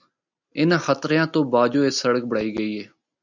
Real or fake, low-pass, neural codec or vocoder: real; 7.2 kHz; none